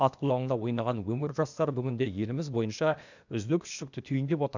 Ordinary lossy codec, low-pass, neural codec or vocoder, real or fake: none; 7.2 kHz; codec, 16 kHz, 0.8 kbps, ZipCodec; fake